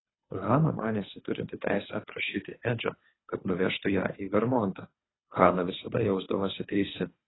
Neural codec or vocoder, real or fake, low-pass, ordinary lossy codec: codec, 24 kHz, 3 kbps, HILCodec; fake; 7.2 kHz; AAC, 16 kbps